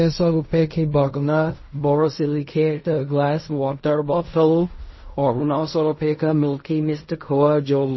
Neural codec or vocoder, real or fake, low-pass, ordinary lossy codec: codec, 16 kHz in and 24 kHz out, 0.4 kbps, LongCat-Audio-Codec, fine tuned four codebook decoder; fake; 7.2 kHz; MP3, 24 kbps